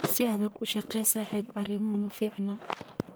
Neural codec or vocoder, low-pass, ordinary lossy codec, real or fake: codec, 44.1 kHz, 1.7 kbps, Pupu-Codec; none; none; fake